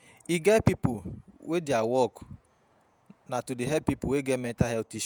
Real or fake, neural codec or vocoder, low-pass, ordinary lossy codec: fake; vocoder, 48 kHz, 128 mel bands, Vocos; none; none